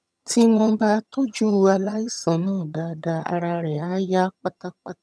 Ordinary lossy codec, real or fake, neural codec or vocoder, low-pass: none; fake; vocoder, 22.05 kHz, 80 mel bands, HiFi-GAN; none